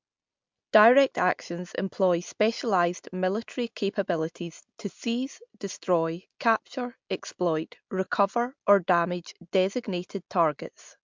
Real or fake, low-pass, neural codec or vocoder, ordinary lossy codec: real; 7.2 kHz; none; MP3, 64 kbps